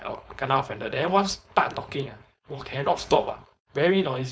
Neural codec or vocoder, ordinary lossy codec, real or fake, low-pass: codec, 16 kHz, 4.8 kbps, FACodec; none; fake; none